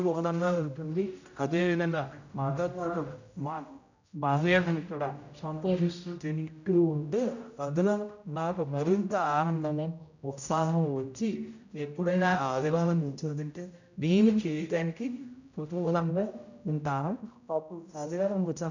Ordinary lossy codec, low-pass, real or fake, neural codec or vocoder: none; 7.2 kHz; fake; codec, 16 kHz, 0.5 kbps, X-Codec, HuBERT features, trained on general audio